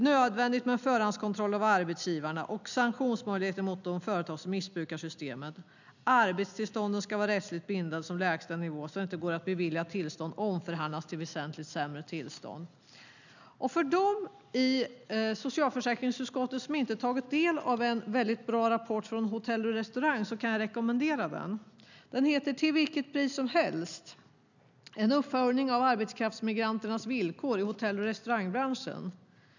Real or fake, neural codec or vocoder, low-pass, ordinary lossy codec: real; none; 7.2 kHz; none